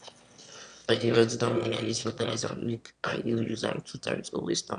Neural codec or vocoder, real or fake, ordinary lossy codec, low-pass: autoencoder, 22.05 kHz, a latent of 192 numbers a frame, VITS, trained on one speaker; fake; none; 9.9 kHz